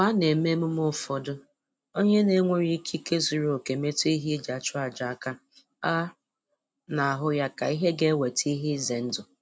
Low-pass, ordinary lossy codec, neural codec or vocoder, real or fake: none; none; none; real